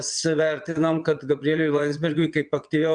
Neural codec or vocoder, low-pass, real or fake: vocoder, 22.05 kHz, 80 mel bands, WaveNeXt; 9.9 kHz; fake